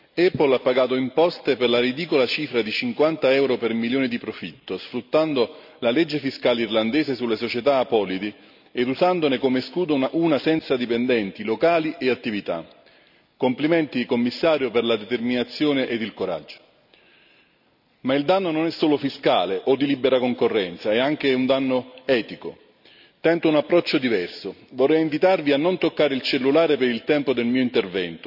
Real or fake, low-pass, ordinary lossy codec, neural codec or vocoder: real; 5.4 kHz; none; none